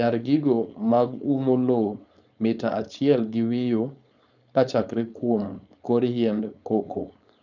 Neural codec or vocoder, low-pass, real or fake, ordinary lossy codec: codec, 16 kHz, 4.8 kbps, FACodec; 7.2 kHz; fake; none